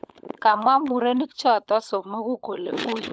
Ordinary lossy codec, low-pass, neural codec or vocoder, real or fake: none; none; codec, 16 kHz, 16 kbps, FunCodec, trained on LibriTTS, 50 frames a second; fake